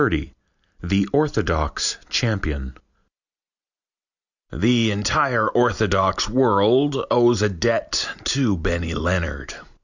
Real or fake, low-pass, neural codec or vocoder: real; 7.2 kHz; none